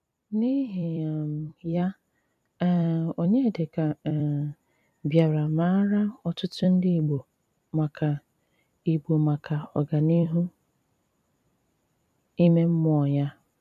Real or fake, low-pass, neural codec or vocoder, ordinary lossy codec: fake; 14.4 kHz; vocoder, 44.1 kHz, 128 mel bands every 256 samples, BigVGAN v2; none